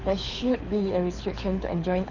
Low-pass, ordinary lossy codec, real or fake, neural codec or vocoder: 7.2 kHz; none; fake; codec, 24 kHz, 6 kbps, HILCodec